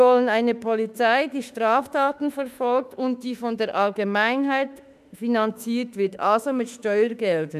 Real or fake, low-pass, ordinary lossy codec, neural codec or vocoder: fake; 14.4 kHz; none; autoencoder, 48 kHz, 32 numbers a frame, DAC-VAE, trained on Japanese speech